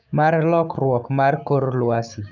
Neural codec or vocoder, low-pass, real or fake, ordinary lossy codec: codec, 16 kHz, 6 kbps, DAC; 7.2 kHz; fake; none